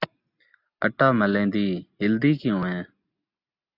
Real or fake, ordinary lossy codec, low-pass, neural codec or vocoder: real; AAC, 48 kbps; 5.4 kHz; none